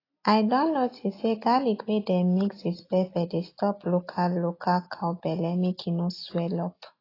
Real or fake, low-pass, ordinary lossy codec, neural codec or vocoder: real; 5.4 kHz; AAC, 24 kbps; none